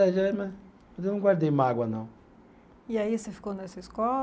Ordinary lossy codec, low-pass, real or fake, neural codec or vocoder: none; none; real; none